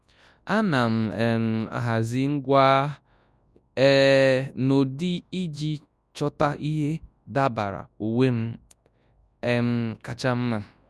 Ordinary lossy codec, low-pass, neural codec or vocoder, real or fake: none; none; codec, 24 kHz, 0.9 kbps, WavTokenizer, large speech release; fake